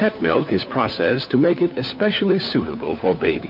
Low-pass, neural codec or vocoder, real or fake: 5.4 kHz; codec, 16 kHz, 4 kbps, FunCodec, trained on LibriTTS, 50 frames a second; fake